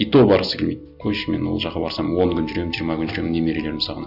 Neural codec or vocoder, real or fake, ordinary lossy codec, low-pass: none; real; none; 5.4 kHz